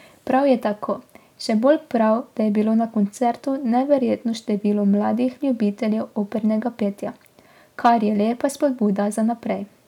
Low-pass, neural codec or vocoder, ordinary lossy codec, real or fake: 19.8 kHz; none; none; real